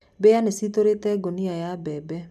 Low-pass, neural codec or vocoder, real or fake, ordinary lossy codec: 14.4 kHz; none; real; none